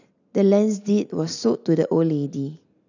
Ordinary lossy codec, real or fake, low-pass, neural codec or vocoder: none; real; 7.2 kHz; none